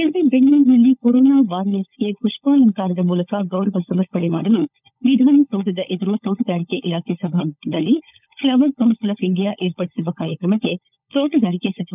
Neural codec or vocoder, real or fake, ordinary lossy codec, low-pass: codec, 16 kHz, 16 kbps, FunCodec, trained on LibriTTS, 50 frames a second; fake; none; 3.6 kHz